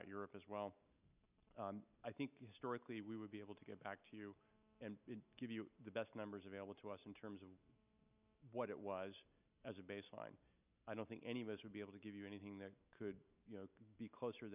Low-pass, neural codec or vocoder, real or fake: 3.6 kHz; none; real